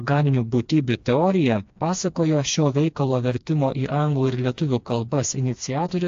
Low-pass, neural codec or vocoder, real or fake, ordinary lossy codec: 7.2 kHz; codec, 16 kHz, 2 kbps, FreqCodec, smaller model; fake; AAC, 48 kbps